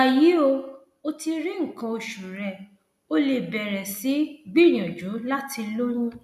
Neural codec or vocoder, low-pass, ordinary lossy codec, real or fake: none; 14.4 kHz; none; real